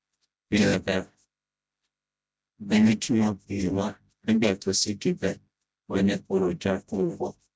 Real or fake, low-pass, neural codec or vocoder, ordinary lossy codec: fake; none; codec, 16 kHz, 0.5 kbps, FreqCodec, smaller model; none